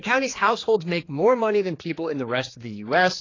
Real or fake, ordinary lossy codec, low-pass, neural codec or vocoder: fake; AAC, 32 kbps; 7.2 kHz; codec, 16 kHz, 2 kbps, X-Codec, HuBERT features, trained on general audio